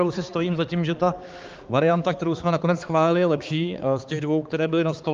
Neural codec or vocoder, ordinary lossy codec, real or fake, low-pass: codec, 16 kHz, 4 kbps, X-Codec, HuBERT features, trained on balanced general audio; Opus, 32 kbps; fake; 7.2 kHz